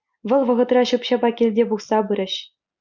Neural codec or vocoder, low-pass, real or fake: none; 7.2 kHz; real